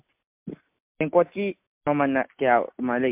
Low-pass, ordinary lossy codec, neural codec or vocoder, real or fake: 3.6 kHz; MP3, 32 kbps; none; real